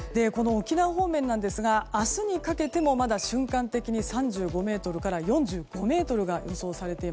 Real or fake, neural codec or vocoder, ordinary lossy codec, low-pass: real; none; none; none